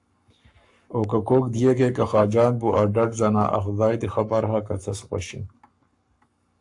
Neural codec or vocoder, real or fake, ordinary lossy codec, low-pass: codec, 44.1 kHz, 7.8 kbps, Pupu-Codec; fake; AAC, 64 kbps; 10.8 kHz